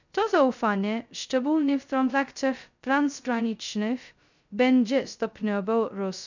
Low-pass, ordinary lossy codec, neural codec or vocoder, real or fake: 7.2 kHz; none; codec, 16 kHz, 0.2 kbps, FocalCodec; fake